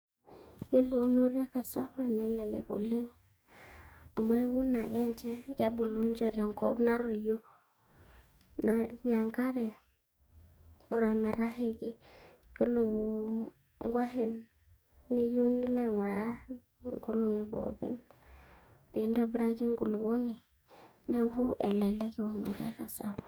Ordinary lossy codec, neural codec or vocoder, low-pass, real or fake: none; codec, 44.1 kHz, 2.6 kbps, DAC; none; fake